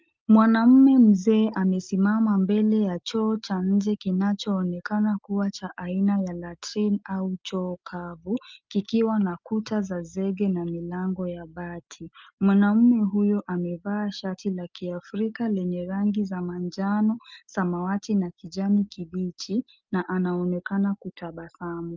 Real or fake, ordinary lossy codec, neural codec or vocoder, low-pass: real; Opus, 24 kbps; none; 7.2 kHz